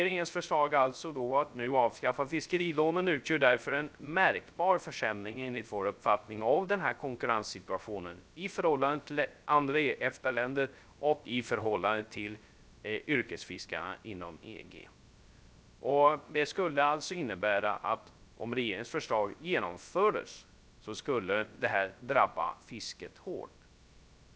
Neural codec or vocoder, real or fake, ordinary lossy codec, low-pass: codec, 16 kHz, 0.3 kbps, FocalCodec; fake; none; none